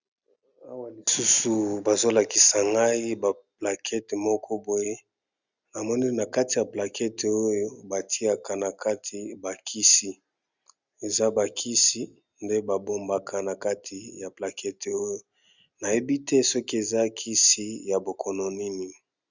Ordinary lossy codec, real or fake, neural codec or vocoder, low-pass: Opus, 64 kbps; real; none; 7.2 kHz